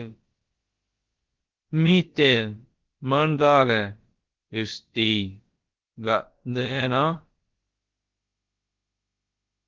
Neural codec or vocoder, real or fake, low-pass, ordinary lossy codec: codec, 16 kHz, about 1 kbps, DyCAST, with the encoder's durations; fake; 7.2 kHz; Opus, 16 kbps